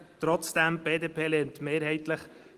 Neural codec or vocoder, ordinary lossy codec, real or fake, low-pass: none; Opus, 24 kbps; real; 14.4 kHz